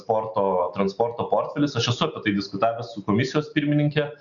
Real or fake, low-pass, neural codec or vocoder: real; 7.2 kHz; none